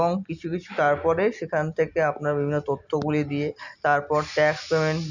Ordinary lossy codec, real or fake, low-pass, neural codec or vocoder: none; real; 7.2 kHz; none